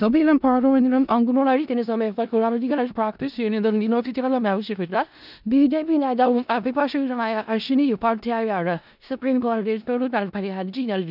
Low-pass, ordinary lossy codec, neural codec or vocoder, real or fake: 5.4 kHz; none; codec, 16 kHz in and 24 kHz out, 0.4 kbps, LongCat-Audio-Codec, four codebook decoder; fake